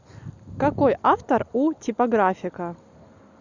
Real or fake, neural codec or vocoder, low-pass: real; none; 7.2 kHz